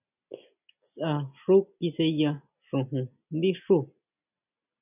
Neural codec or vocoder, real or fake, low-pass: none; real; 3.6 kHz